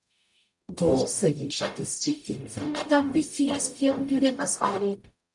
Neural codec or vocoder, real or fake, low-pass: codec, 44.1 kHz, 0.9 kbps, DAC; fake; 10.8 kHz